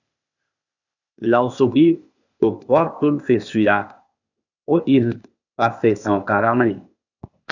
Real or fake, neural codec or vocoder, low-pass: fake; codec, 16 kHz, 0.8 kbps, ZipCodec; 7.2 kHz